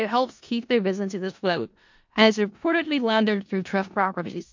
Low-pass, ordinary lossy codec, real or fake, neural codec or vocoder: 7.2 kHz; MP3, 48 kbps; fake; codec, 16 kHz in and 24 kHz out, 0.4 kbps, LongCat-Audio-Codec, four codebook decoder